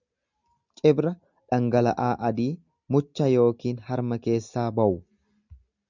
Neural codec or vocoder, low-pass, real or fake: none; 7.2 kHz; real